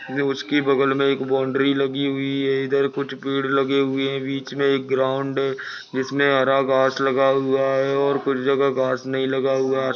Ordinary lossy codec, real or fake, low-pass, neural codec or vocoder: none; real; none; none